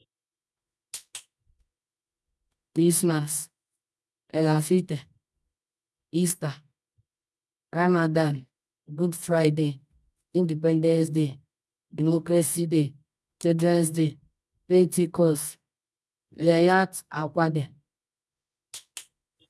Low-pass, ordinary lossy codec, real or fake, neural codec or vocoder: none; none; fake; codec, 24 kHz, 0.9 kbps, WavTokenizer, medium music audio release